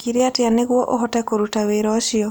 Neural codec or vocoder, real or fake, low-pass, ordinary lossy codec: none; real; none; none